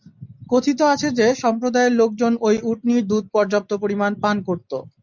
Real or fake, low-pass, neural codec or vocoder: real; 7.2 kHz; none